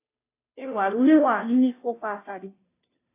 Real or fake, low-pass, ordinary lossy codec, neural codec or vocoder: fake; 3.6 kHz; AAC, 24 kbps; codec, 16 kHz, 0.5 kbps, FunCodec, trained on Chinese and English, 25 frames a second